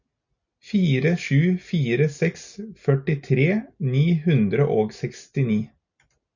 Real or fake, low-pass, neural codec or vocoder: real; 7.2 kHz; none